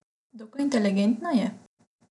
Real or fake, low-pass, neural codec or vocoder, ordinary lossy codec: real; 10.8 kHz; none; none